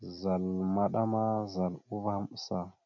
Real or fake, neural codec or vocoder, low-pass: real; none; 7.2 kHz